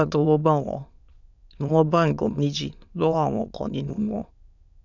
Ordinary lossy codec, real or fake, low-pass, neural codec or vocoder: none; fake; 7.2 kHz; autoencoder, 22.05 kHz, a latent of 192 numbers a frame, VITS, trained on many speakers